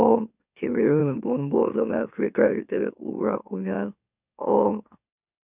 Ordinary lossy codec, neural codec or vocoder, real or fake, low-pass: none; autoencoder, 44.1 kHz, a latent of 192 numbers a frame, MeloTTS; fake; 3.6 kHz